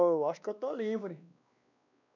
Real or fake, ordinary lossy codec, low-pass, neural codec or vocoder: fake; none; 7.2 kHz; codec, 16 kHz, 2 kbps, X-Codec, WavLM features, trained on Multilingual LibriSpeech